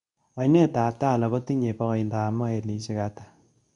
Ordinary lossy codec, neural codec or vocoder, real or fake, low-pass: none; codec, 24 kHz, 0.9 kbps, WavTokenizer, medium speech release version 2; fake; 10.8 kHz